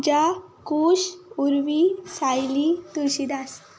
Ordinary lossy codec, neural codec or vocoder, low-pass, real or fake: none; none; none; real